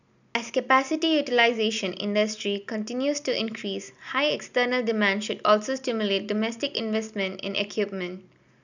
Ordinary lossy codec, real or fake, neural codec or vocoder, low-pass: none; real; none; 7.2 kHz